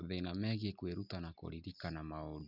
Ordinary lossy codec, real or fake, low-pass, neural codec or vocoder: none; real; 5.4 kHz; none